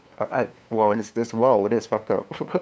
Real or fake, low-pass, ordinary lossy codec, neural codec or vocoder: fake; none; none; codec, 16 kHz, 2 kbps, FunCodec, trained on LibriTTS, 25 frames a second